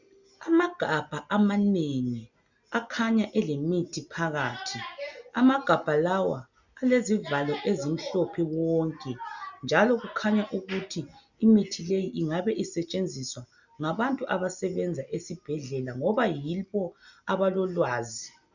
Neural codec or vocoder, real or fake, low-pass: none; real; 7.2 kHz